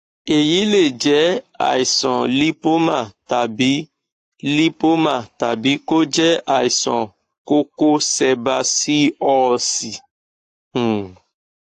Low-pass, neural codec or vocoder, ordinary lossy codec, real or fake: 14.4 kHz; codec, 44.1 kHz, 7.8 kbps, Pupu-Codec; AAC, 48 kbps; fake